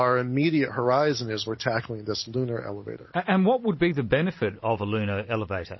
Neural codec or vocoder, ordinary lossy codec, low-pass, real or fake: none; MP3, 24 kbps; 7.2 kHz; real